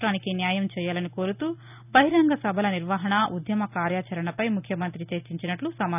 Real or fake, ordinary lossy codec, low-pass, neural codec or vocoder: real; none; 3.6 kHz; none